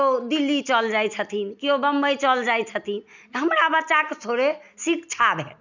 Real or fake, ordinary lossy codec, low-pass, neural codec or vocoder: real; none; 7.2 kHz; none